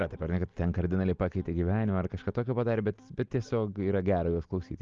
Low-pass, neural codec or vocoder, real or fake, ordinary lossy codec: 7.2 kHz; none; real; Opus, 32 kbps